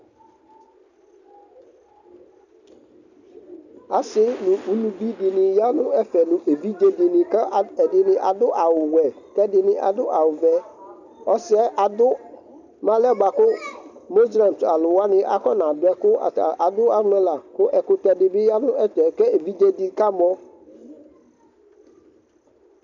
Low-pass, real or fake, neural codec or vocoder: 7.2 kHz; real; none